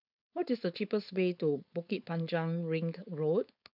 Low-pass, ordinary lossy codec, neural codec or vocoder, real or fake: 5.4 kHz; none; codec, 16 kHz, 4.8 kbps, FACodec; fake